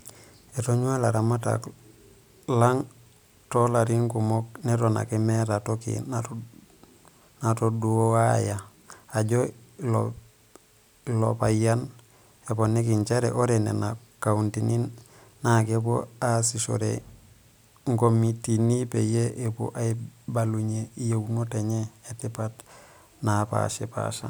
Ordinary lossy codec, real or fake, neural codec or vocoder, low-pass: none; real; none; none